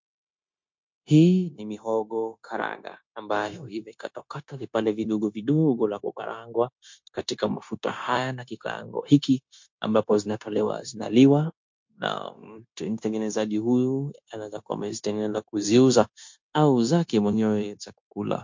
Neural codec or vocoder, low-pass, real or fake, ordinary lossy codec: codec, 16 kHz, 0.9 kbps, LongCat-Audio-Codec; 7.2 kHz; fake; MP3, 48 kbps